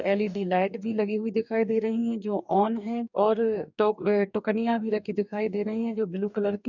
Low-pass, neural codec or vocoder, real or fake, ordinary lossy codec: 7.2 kHz; codec, 44.1 kHz, 2.6 kbps, DAC; fake; none